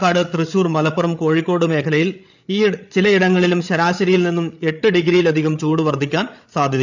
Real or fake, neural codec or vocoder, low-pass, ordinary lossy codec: fake; codec, 16 kHz, 8 kbps, FreqCodec, larger model; 7.2 kHz; none